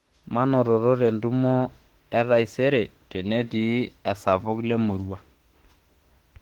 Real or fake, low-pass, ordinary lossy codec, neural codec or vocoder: fake; 19.8 kHz; Opus, 16 kbps; autoencoder, 48 kHz, 32 numbers a frame, DAC-VAE, trained on Japanese speech